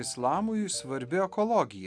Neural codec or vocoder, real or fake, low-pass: none; real; 9.9 kHz